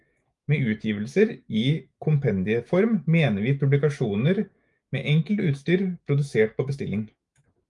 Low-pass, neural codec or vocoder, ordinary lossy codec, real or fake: 10.8 kHz; autoencoder, 48 kHz, 128 numbers a frame, DAC-VAE, trained on Japanese speech; Opus, 32 kbps; fake